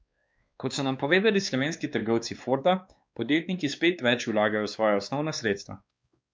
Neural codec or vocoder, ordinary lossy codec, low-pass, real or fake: codec, 16 kHz, 2 kbps, X-Codec, WavLM features, trained on Multilingual LibriSpeech; none; none; fake